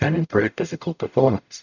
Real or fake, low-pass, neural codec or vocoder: fake; 7.2 kHz; codec, 44.1 kHz, 0.9 kbps, DAC